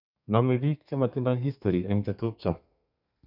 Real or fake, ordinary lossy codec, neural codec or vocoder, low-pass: fake; none; codec, 32 kHz, 1.9 kbps, SNAC; 5.4 kHz